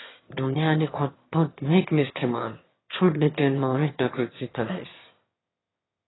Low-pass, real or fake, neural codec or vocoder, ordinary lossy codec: 7.2 kHz; fake; autoencoder, 22.05 kHz, a latent of 192 numbers a frame, VITS, trained on one speaker; AAC, 16 kbps